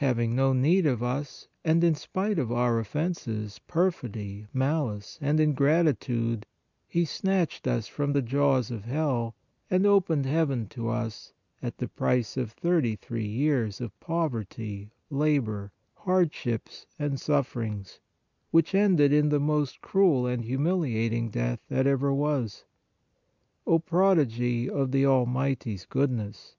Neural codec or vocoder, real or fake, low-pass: none; real; 7.2 kHz